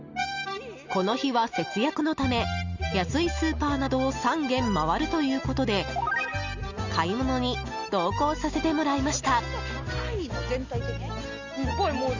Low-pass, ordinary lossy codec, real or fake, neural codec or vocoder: 7.2 kHz; Opus, 64 kbps; real; none